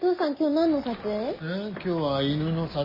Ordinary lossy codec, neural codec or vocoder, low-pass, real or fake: MP3, 24 kbps; none; 5.4 kHz; real